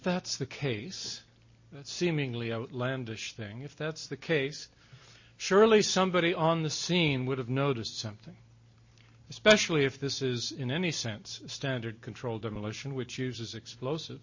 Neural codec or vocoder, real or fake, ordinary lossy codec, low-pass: none; real; MP3, 32 kbps; 7.2 kHz